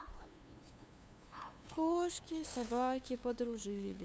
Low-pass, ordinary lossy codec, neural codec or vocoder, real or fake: none; none; codec, 16 kHz, 2 kbps, FunCodec, trained on LibriTTS, 25 frames a second; fake